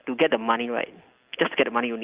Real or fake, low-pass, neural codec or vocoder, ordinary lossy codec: real; 3.6 kHz; none; Opus, 32 kbps